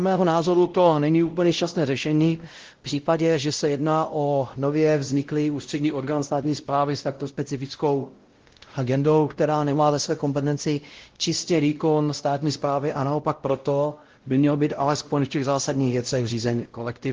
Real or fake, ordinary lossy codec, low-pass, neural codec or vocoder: fake; Opus, 16 kbps; 7.2 kHz; codec, 16 kHz, 0.5 kbps, X-Codec, WavLM features, trained on Multilingual LibriSpeech